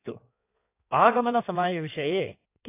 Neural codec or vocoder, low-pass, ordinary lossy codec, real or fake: codec, 16 kHz in and 24 kHz out, 1.1 kbps, FireRedTTS-2 codec; 3.6 kHz; AAC, 24 kbps; fake